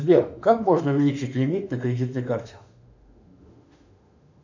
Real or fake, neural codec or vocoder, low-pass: fake; autoencoder, 48 kHz, 32 numbers a frame, DAC-VAE, trained on Japanese speech; 7.2 kHz